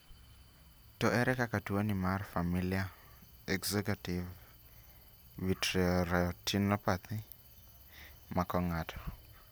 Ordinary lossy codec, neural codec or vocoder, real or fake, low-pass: none; none; real; none